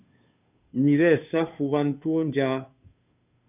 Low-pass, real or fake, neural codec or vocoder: 3.6 kHz; fake; codec, 16 kHz, 2 kbps, FunCodec, trained on Chinese and English, 25 frames a second